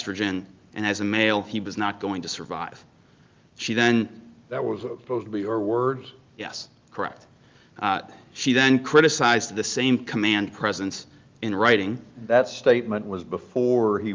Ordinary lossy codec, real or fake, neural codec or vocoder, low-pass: Opus, 32 kbps; real; none; 7.2 kHz